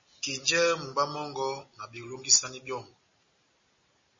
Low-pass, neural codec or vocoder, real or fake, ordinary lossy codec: 7.2 kHz; none; real; MP3, 32 kbps